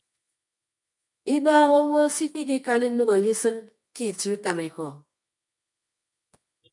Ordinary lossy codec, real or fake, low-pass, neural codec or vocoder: MP3, 64 kbps; fake; 10.8 kHz; codec, 24 kHz, 0.9 kbps, WavTokenizer, medium music audio release